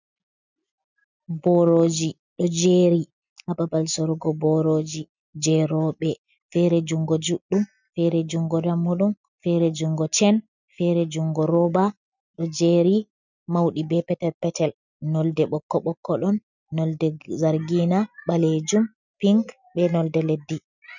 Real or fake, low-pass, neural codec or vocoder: real; 7.2 kHz; none